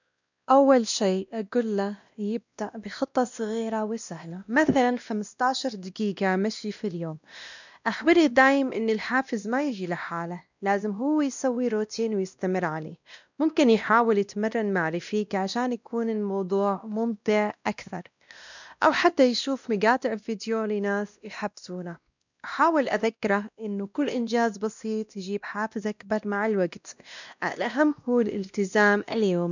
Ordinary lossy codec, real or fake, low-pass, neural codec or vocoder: none; fake; 7.2 kHz; codec, 16 kHz, 1 kbps, X-Codec, WavLM features, trained on Multilingual LibriSpeech